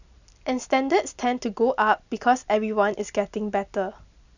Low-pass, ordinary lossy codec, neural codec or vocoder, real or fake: 7.2 kHz; none; none; real